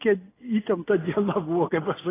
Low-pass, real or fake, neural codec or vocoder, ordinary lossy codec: 3.6 kHz; real; none; AAC, 16 kbps